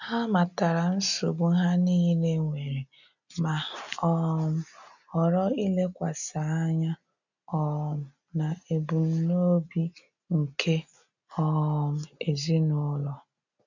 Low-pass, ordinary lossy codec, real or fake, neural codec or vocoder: 7.2 kHz; none; real; none